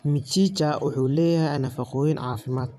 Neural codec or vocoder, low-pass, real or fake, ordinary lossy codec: vocoder, 44.1 kHz, 128 mel bands every 256 samples, BigVGAN v2; 14.4 kHz; fake; none